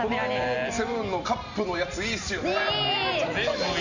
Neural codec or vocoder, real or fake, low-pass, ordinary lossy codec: none; real; 7.2 kHz; none